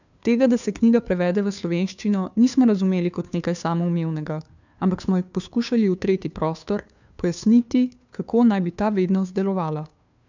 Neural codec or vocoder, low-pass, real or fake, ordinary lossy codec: codec, 16 kHz, 2 kbps, FunCodec, trained on Chinese and English, 25 frames a second; 7.2 kHz; fake; none